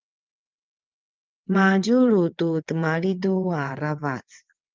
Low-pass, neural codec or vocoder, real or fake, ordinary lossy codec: 7.2 kHz; vocoder, 22.05 kHz, 80 mel bands, WaveNeXt; fake; Opus, 24 kbps